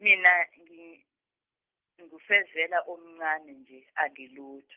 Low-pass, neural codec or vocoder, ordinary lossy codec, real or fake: 3.6 kHz; none; Opus, 32 kbps; real